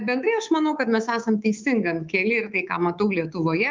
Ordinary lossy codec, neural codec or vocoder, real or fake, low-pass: Opus, 24 kbps; codec, 24 kHz, 3.1 kbps, DualCodec; fake; 7.2 kHz